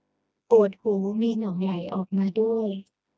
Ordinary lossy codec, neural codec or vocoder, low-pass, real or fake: none; codec, 16 kHz, 1 kbps, FreqCodec, smaller model; none; fake